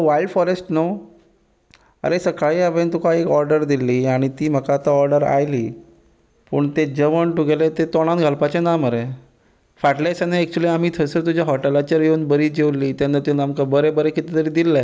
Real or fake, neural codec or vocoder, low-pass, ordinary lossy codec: real; none; none; none